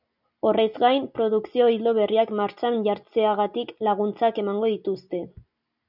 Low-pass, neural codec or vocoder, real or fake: 5.4 kHz; none; real